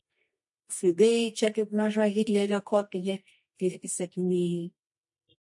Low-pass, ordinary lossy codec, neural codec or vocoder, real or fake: 10.8 kHz; MP3, 48 kbps; codec, 24 kHz, 0.9 kbps, WavTokenizer, medium music audio release; fake